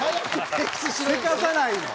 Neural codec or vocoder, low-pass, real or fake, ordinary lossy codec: none; none; real; none